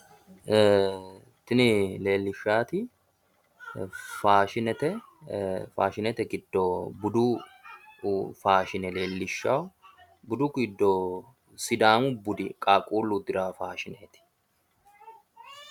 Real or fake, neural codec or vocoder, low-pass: real; none; 19.8 kHz